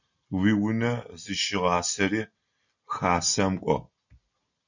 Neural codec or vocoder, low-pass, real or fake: none; 7.2 kHz; real